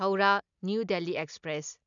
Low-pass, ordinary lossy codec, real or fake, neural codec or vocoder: 7.2 kHz; none; real; none